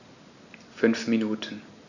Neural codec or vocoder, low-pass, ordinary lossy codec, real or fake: none; 7.2 kHz; none; real